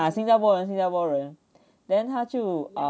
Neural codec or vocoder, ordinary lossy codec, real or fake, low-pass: none; none; real; none